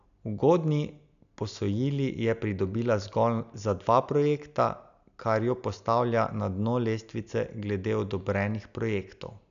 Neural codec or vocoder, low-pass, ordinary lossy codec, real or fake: none; 7.2 kHz; none; real